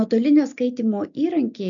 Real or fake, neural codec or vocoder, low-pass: real; none; 7.2 kHz